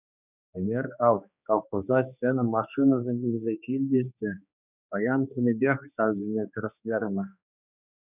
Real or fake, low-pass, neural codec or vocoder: fake; 3.6 kHz; codec, 16 kHz, 2 kbps, X-Codec, HuBERT features, trained on general audio